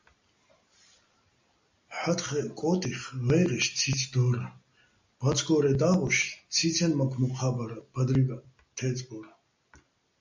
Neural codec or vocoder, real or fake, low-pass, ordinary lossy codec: none; real; 7.2 kHz; MP3, 64 kbps